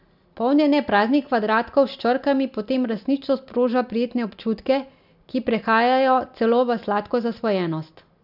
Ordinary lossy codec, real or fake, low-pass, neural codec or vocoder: none; real; 5.4 kHz; none